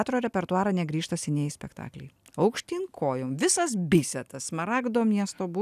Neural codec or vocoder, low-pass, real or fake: none; 14.4 kHz; real